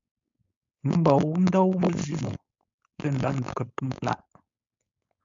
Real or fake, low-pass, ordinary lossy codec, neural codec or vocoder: fake; 7.2 kHz; MP3, 64 kbps; codec, 16 kHz, 4.8 kbps, FACodec